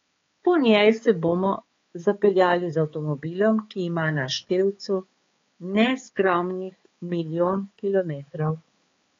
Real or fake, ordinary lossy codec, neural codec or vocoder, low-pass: fake; AAC, 32 kbps; codec, 16 kHz, 4 kbps, X-Codec, HuBERT features, trained on balanced general audio; 7.2 kHz